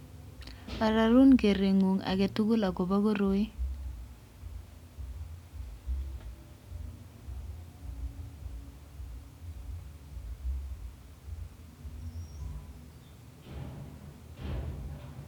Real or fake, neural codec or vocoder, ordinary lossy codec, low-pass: real; none; none; 19.8 kHz